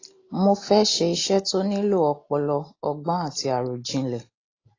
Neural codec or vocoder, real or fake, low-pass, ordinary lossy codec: none; real; 7.2 kHz; AAC, 32 kbps